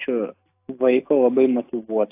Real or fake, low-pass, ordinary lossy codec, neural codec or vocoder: real; 3.6 kHz; AAC, 24 kbps; none